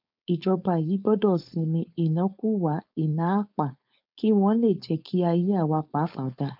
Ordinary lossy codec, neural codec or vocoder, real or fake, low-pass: none; codec, 16 kHz, 4.8 kbps, FACodec; fake; 5.4 kHz